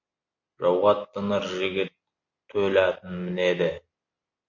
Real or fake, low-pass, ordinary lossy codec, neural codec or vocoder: real; 7.2 kHz; MP3, 48 kbps; none